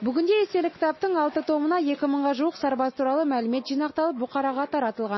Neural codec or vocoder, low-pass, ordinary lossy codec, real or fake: none; 7.2 kHz; MP3, 24 kbps; real